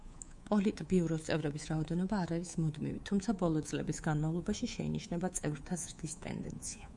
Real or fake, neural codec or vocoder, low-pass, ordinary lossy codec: fake; codec, 24 kHz, 3.1 kbps, DualCodec; 10.8 kHz; Opus, 64 kbps